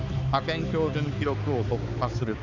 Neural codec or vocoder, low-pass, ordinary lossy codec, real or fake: codec, 16 kHz, 4 kbps, X-Codec, HuBERT features, trained on balanced general audio; 7.2 kHz; none; fake